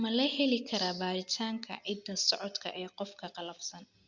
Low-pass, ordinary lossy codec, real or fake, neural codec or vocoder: 7.2 kHz; Opus, 64 kbps; real; none